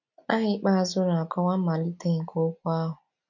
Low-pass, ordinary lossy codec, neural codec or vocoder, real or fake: 7.2 kHz; none; none; real